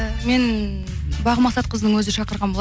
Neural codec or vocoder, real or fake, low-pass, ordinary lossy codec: none; real; none; none